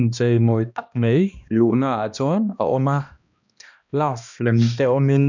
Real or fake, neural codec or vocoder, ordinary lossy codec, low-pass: fake; codec, 16 kHz, 1 kbps, X-Codec, HuBERT features, trained on balanced general audio; none; 7.2 kHz